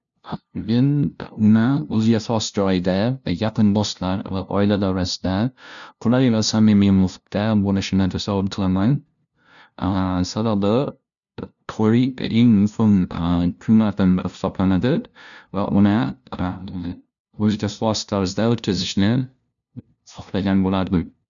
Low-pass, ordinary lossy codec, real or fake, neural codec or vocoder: 7.2 kHz; none; fake; codec, 16 kHz, 0.5 kbps, FunCodec, trained on LibriTTS, 25 frames a second